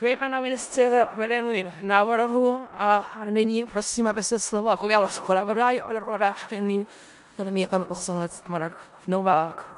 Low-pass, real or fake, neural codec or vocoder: 10.8 kHz; fake; codec, 16 kHz in and 24 kHz out, 0.4 kbps, LongCat-Audio-Codec, four codebook decoder